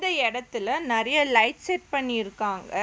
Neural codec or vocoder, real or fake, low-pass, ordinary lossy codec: none; real; none; none